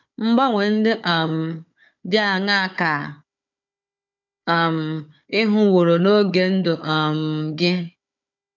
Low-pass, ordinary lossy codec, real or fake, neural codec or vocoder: 7.2 kHz; none; fake; codec, 16 kHz, 4 kbps, FunCodec, trained on Chinese and English, 50 frames a second